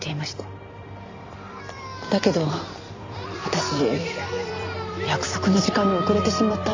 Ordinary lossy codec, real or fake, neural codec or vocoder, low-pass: AAC, 48 kbps; fake; vocoder, 44.1 kHz, 128 mel bands every 256 samples, BigVGAN v2; 7.2 kHz